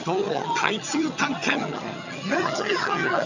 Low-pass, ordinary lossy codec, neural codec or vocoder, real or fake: 7.2 kHz; none; vocoder, 22.05 kHz, 80 mel bands, HiFi-GAN; fake